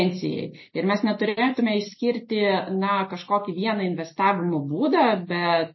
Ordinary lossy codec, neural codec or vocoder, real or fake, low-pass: MP3, 24 kbps; none; real; 7.2 kHz